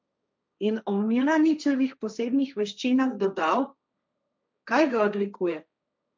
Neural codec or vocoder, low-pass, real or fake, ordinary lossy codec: codec, 16 kHz, 1.1 kbps, Voila-Tokenizer; none; fake; none